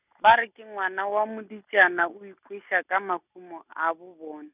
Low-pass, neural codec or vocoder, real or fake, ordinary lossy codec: 3.6 kHz; none; real; none